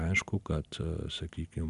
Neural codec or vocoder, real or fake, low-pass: none; real; 10.8 kHz